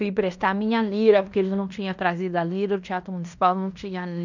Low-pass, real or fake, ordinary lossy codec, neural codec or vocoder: 7.2 kHz; fake; none; codec, 16 kHz in and 24 kHz out, 0.9 kbps, LongCat-Audio-Codec, fine tuned four codebook decoder